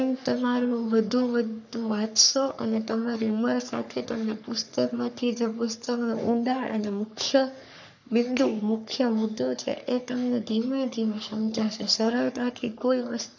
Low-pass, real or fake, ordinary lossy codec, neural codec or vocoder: 7.2 kHz; fake; none; codec, 44.1 kHz, 3.4 kbps, Pupu-Codec